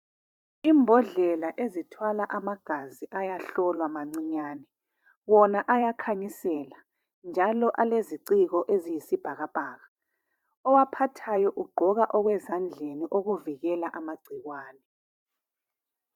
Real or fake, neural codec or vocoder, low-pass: fake; vocoder, 44.1 kHz, 128 mel bands every 512 samples, BigVGAN v2; 19.8 kHz